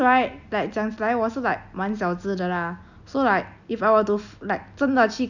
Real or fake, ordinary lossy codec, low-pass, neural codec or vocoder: real; none; 7.2 kHz; none